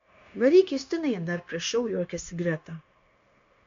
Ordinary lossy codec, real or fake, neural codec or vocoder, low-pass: MP3, 64 kbps; fake; codec, 16 kHz, 0.9 kbps, LongCat-Audio-Codec; 7.2 kHz